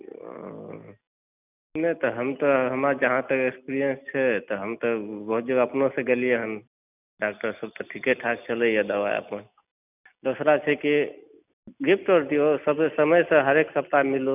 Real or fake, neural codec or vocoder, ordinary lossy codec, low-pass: real; none; none; 3.6 kHz